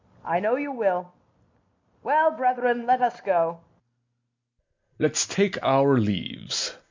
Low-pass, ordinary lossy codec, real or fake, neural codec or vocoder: 7.2 kHz; MP3, 64 kbps; real; none